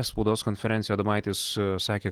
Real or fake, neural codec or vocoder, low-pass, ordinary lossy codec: real; none; 19.8 kHz; Opus, 16 kbps